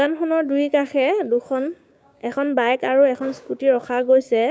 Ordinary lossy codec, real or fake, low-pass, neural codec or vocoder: none; fake; none; codec, 16 kHz, 6 kbps, DAC